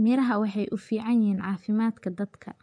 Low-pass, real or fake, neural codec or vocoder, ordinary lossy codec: 9.9 kHz; fake; vocoder, 24 kHz, 100 mel bands, Vocos; none